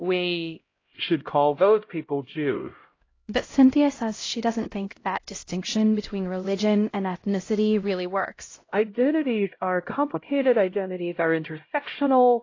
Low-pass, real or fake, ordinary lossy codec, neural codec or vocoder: 7.2 kHz; fake; AAC, 32 kbps; codec, 16 kHz, 0.5 kbps, X-Codec, HuBERT features, trained on LibriSpeech